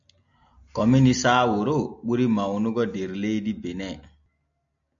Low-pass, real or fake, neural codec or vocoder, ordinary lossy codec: 7.2 kHz; real; none; AAC, 64 kbps